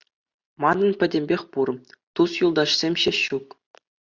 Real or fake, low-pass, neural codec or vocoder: real; 7.2 kHz; none